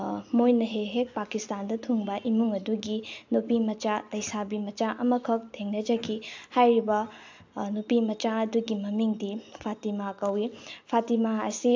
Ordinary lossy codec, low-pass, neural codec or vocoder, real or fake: AAC, 48 kbps; 7.2 kHz; none; real